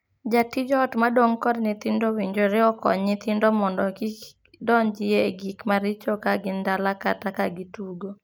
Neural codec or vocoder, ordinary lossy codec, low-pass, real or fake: none; none; none; real